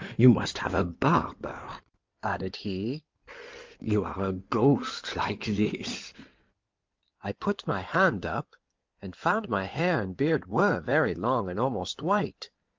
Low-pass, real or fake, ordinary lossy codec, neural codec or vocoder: 7.2 kHz; fake; Opus, 32 kbps; codec, 16 kHz in and 24 kHz out, 2.2 kbps, FireRedTTS-2 codec